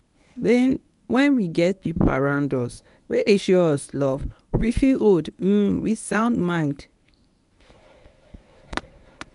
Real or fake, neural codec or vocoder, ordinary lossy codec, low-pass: fake; codec, 24 kHz, 0.9 kbps, WavTokenizer, medium speech release version 1; none; 10.8 kHz